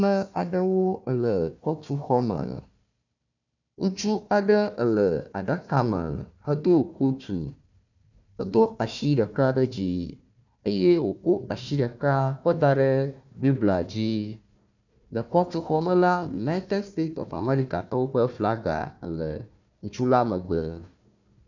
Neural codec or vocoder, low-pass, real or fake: codec, 16 kHz, 1 kbps, FunCodec, trained on Chinese and English, 50 frames a second; 7.2 kHz; fake